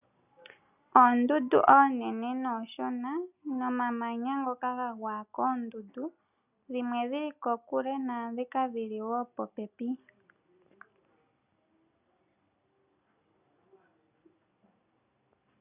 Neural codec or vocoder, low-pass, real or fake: none; 3.6 kHz; real